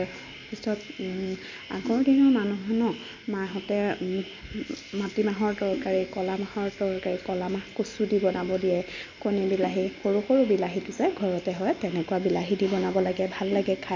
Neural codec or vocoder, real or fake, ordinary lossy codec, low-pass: none; real; MP3, 64 kbps; 7.2 kHz